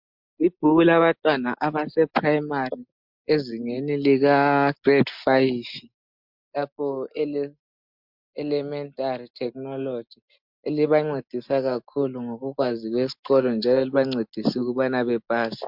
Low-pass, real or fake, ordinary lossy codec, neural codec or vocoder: 5.4 kHz; real; MP3, 48 kbps; none